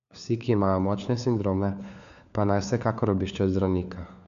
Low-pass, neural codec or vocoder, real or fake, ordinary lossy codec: 7.2 kHz; codec, 16 kHz, 4 kbps, FunCodec, trained on LibriTTS, 50 frames a second; fake; none